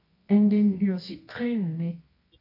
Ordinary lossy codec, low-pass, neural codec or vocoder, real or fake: AAC, 32 kbps; 5.4 kHz; codec, 24 kHz, 0.9 kbps, WavTokenizer, medium music audio release; fake